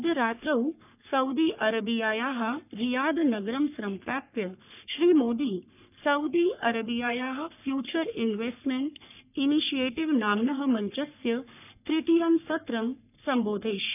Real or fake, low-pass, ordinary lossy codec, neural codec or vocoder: fake; 3.6 kHz; none; codec, 44.1 kHz, 3.4 kbps, Pupu-Codec